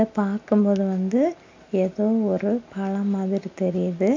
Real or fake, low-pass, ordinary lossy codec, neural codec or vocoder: real; 7.2 kHz; MP3, 64 kbps; none